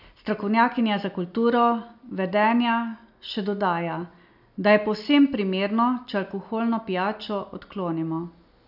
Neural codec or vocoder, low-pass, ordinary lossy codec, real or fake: none; 5.4 kHz; none; real